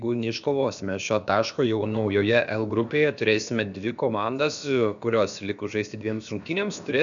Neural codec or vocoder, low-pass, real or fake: codec, 16 kHz, about 1 kbps, DyCAST, with the encoder's durations; 7.2 kHz; fake